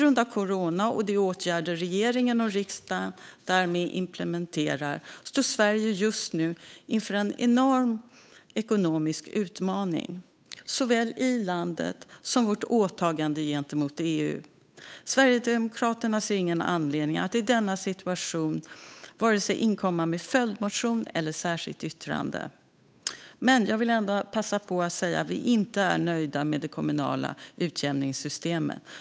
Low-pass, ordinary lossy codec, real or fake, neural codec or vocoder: none; none; fake; codec, 16 kHz, 8 kbps, FunCodec, trained on Chinese and English, 25 frames a second